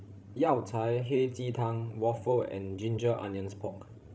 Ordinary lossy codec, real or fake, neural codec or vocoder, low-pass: none; fake; codec, 16 kHz, 16 kbps, FreqCodec, larger model; none